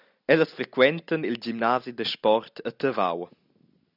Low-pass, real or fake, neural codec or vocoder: 5.4 kHz; real; none